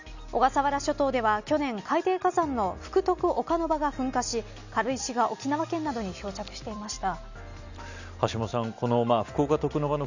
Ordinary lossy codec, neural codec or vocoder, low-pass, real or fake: none; none; 7.2 kHz; real